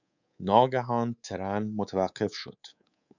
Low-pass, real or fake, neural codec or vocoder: 7.2 kHz; fake; codec, 24 kHz, 3.1 kbps, DualCodec